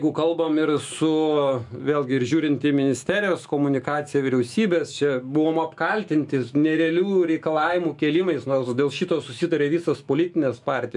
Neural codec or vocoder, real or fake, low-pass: autoencoder, 48 kHz, 128 numbers a frame, DAC-VAE, trained on Japanese speech; fake; 10.8 kHz